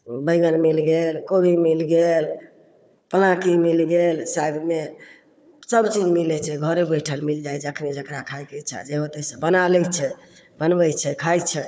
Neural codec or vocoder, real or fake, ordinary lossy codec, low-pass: codec, 16 kHz, 4 kbps, FunCodec, trained on Chinese and English, 50 frames a second; fake; none; none